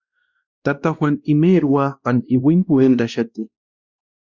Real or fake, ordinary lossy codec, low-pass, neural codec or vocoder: fake; Opus, 64 kbps; 7.2 kHz; codec, 16 kHz, 1 kbps, X-Codec, WavLM features, trained on Multilingual LibriSpeech